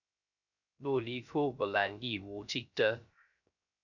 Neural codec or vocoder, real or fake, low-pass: codec, 16 kHz, 0.3 kbps, FocalCodec; fake; 7.2 kHz